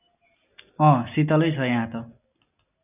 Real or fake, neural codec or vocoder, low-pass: real; none; 3.6 kHz